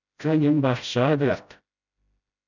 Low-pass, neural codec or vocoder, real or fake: 7.2 kHz; codec, 16 kHz, 0.5 kbps, FreqCodec, smaller model; fake